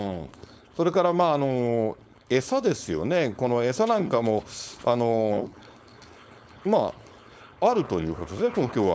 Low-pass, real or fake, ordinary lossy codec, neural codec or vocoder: none; fake; none; codec, 16 kHz, 4.8 kbps, FACodec